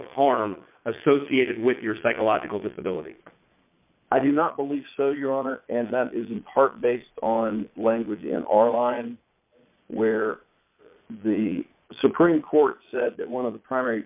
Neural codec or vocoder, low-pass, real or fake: vocoder, 22.05 kHz, 80 mel bands, Vocos; 3.6 kHz; fake